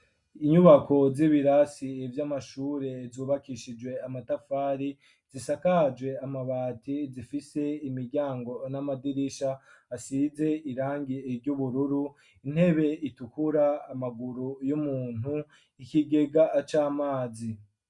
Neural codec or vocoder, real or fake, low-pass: none; real; 10.8 kHz